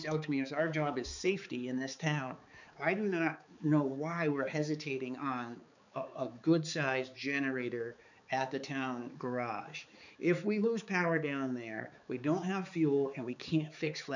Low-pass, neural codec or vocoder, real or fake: 7.2 kHz; codec, 16 kHz, 4 kbps, X-Codec, HuBERT features, trained on balanced general audio; fake